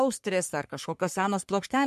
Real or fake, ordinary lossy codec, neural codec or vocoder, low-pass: fake; MP3, 64 kbps; codec, 44.1 kHz, 3.4 kbps, Pupu-Codec; 14.4 kHz